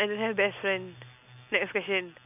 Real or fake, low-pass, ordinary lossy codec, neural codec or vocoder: real; 3.6 kHz; none; none